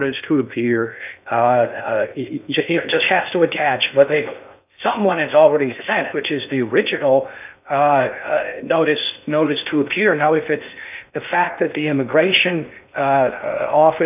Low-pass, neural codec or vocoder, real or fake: 3.6 kHz; codec, 16 kHz in and 24 kHz out, 0.6 kbps, FocalCodec, streaming, 2048 codes; fake